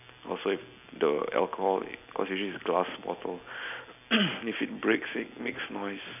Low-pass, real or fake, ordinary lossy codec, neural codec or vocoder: 3.6 kHz; real; none; none